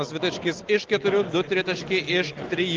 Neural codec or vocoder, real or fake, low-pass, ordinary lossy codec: none; real; 7.2 kHz; Opus, 16 kbps